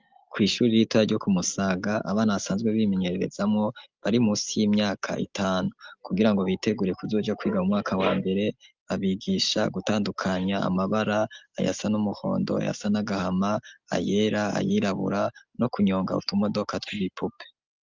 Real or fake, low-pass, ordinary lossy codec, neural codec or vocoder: real; 7.2 kHz; Opus, 32 kbps; none